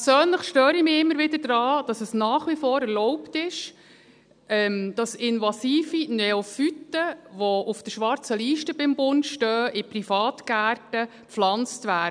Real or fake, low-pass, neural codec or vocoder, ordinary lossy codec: real; 9.9 kHz; none; none